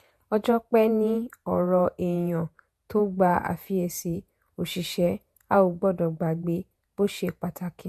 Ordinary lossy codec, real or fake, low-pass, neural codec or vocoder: MP3, 64 kbps; fake; 14.4 kHz; vocoder, 48 kHz, 128 mel bands, Vocos